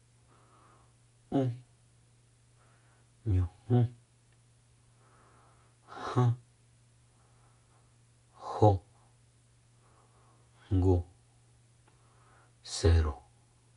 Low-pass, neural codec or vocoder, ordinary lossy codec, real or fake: 10.8 kHz; none; none; real